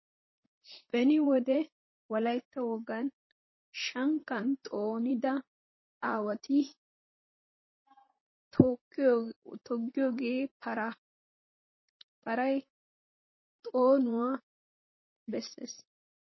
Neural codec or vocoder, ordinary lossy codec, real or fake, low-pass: vocoder, 44.1 kHz, 128 mel bands, Pupu-Vocoder; MP3, 24 kbps; fake; 7.2 kHz